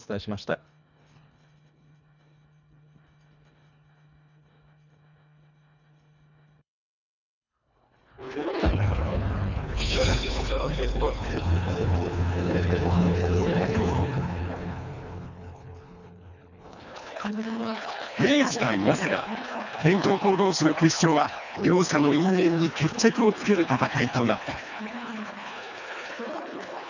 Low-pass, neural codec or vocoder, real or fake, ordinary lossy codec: 7.2 kHz; codec, 24 kHz, 1.5 kbps, HILCodec; fake; none